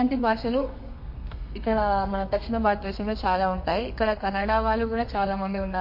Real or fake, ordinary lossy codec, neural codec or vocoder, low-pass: fake; MP3, 32 kbps; codec, 32 kHz, 1.9 kbps, SNAC; 5.4 kHz